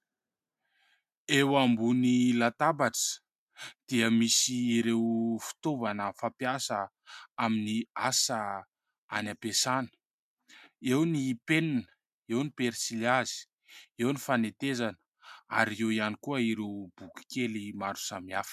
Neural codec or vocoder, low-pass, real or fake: none; 14.4 kHz; real